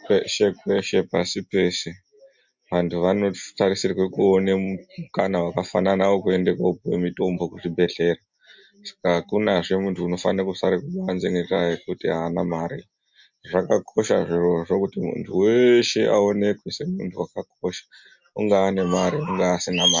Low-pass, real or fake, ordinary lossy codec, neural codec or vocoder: 7.2 kHz; real; MP3, 64 kbps; none